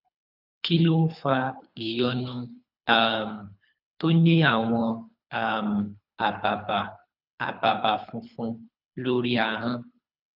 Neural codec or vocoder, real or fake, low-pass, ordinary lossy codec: codec, 24 kHz, 3 kbps, HILCodec; fake; 5.4 kHz; none